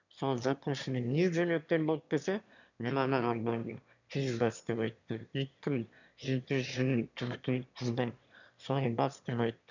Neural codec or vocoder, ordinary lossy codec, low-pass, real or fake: autoencoder, 22.05 kHz, a latent of 192 numbers a frame, VITS, trained on one speaker; none; 7.2 kHz; fake